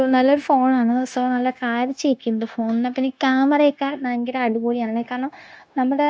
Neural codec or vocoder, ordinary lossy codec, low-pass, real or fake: codec, 16 kHz, 0.9 kbps, LongCat-Audio-Codec; none; none; fake